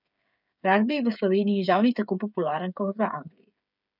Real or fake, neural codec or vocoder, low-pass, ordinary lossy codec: fake; codec, 16 kHz, 8 kbps, FreqCodec, smaller model; 5.4 kHz; none